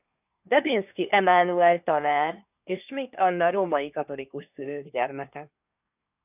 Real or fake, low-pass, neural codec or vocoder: fake; 3.6 kHz; codec, 24 kHz, 1 kbps, SNAC